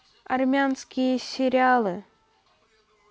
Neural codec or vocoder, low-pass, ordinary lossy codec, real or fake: none; none; none; real